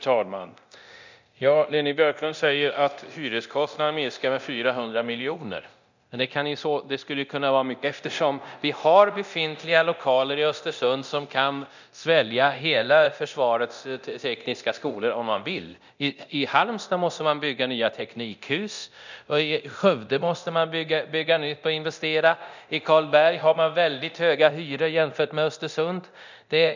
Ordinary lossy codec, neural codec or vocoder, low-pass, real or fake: none; codec, 24 kHz, 0.9 kbps, DualCodec; 7.2 kHz; fake